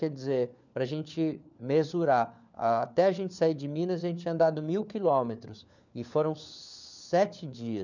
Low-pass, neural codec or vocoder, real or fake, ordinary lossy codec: 7.2 kHz; codec, 16 kHz, 4 kbps, FunCodec, trained on LibriTTS, 50 frames a second; fake; none